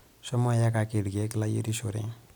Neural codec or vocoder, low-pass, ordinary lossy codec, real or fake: none; none; none; real